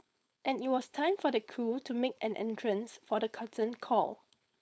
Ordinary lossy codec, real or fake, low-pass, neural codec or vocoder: none; fake; none; codec, 16 kHz, 4.8 kbps, FACodec